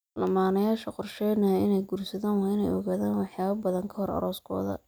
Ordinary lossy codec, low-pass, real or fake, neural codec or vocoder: none; none; real; none